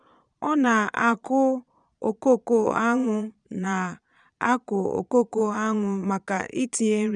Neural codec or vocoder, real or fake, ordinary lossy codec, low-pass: vocoder, 22.05 kHz, 80 mel bands, Vocos; fake; none; 9.9 kHz